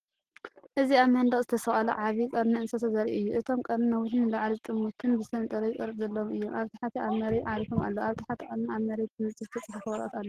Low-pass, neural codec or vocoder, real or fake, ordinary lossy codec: 14.4 kHz; none; real; Opus, 16 kbps